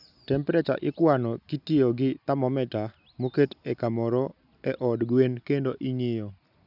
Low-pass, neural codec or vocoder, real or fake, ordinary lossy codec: 5.4 kHz; none; real; none